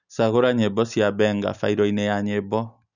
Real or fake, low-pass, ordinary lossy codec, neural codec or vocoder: real; 7.2 kHz; none; none